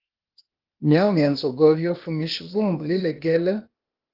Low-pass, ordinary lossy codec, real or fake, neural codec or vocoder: 5.4 kHz; Opus, 32 kbps; fake; codec, 16 kHz, 0.8 kbps, ZipCodec